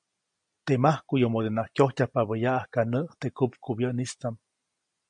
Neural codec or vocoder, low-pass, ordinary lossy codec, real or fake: none; 9.9 kHz; MP3, 64 kbps; real